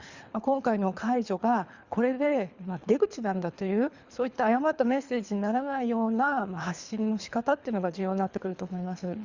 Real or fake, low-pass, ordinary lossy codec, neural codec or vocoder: fake; 7.2 kHz; Opus, 64 kbps; codec, 24 kHz, 3 kbps, HILCodec